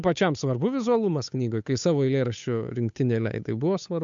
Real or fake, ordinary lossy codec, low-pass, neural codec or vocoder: fake; MP3, 64 kbps; 7.2 kHz; codec, 16 kHz, 8 kbps, FunCodec, trained on Chinese and English, 25 frames a second